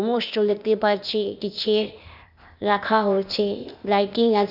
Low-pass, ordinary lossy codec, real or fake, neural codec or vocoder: 5.4 kHz; none; fake; codec, 16 kHz, 0.8 kbps, ZipCodec